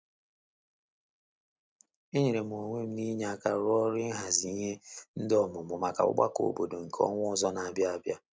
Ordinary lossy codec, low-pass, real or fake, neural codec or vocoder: none; none; real; none